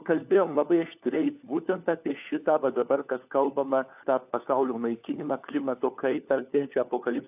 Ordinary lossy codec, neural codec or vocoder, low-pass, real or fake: AAC, 32 kbps; codec, 16 kHz, 4 kbps, FunCodec, trained on LibriTTS, 50 frames a second; 3.6 kHz; fake